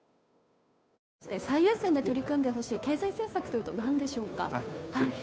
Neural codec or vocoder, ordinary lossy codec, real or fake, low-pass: codec, 16 kHz, 2 kbps, FunCodec, trained on Chinese and English, 25 frames a second; none; fake; none